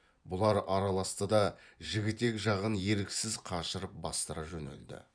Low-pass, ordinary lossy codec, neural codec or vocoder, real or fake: 9.9 kHz; none; vocoder, 24 kHz, 100 mel bands, Vocos; fake